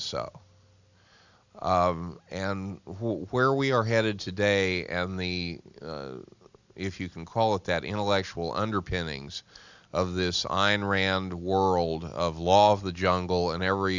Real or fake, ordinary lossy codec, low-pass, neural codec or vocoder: real; Opus, 64 kbps; 7.2 kHz; none